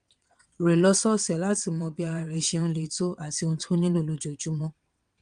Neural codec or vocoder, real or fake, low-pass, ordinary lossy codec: vocoder, 22.05 kHz, 80 mel bands, WaveNeXt; fake; 9.9 kHz; Opus, 32 kbps